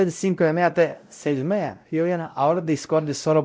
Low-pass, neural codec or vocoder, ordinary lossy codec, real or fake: none; codec, 16 kHz, 0.5 kbps, X-Codec, WavLM features, trained on Multilingual LibriSpeech; none; fake